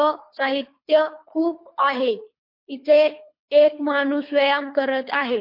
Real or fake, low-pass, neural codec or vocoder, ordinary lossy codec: fake; 5.4 kHz; codec, 24 kHz, 3 kbps, HILCodec; MP3, 32 kbps